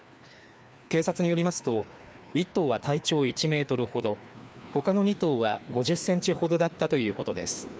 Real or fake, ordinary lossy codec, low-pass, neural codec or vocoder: fake; none; none; codec, 16 kHz, 2 kbps, FreqCodec, larger model